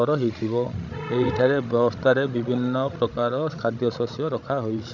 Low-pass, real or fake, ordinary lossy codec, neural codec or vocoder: 7.2 kHz; fake; none; codec, 16 kHz, 16 kbps, FreqCodec, larger model